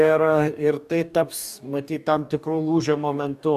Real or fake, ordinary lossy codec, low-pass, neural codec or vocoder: fake; AAC, 96 kbps; 14.4 kHz; codec, 44.1 kHz, 2.6 kbps, DAC